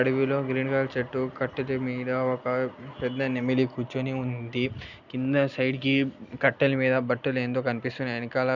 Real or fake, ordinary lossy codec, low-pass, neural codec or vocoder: real; none; 7.2 kHz; none